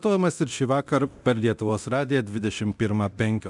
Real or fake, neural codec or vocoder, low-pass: fake; codec, 24 kHz, 0.9 kbps, DualCodec; 10.8 kHz